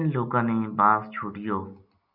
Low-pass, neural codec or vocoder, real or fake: 5.4 kHz; none; real